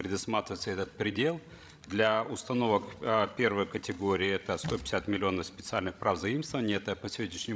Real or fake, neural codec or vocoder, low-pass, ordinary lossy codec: fake; codec, 16 kHz, 16 kbps, FreqCodec, larger model; none; none